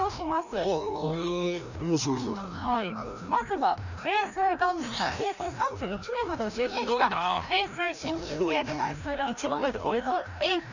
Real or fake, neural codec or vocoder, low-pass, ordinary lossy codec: fake; codec, 16 kHz, 1 kbps, FreqCodec, larger model; 7.2 kHz; none